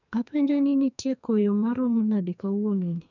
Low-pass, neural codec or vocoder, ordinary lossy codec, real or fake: 7.2 kHz; codec, 44.1 kHz, 2.6 kbps, SNAC; none; fake